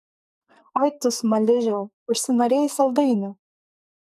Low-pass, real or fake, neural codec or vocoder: 14.4 kHz; fake; codec, 44.1 kHz, 2.6 kbps, SNAC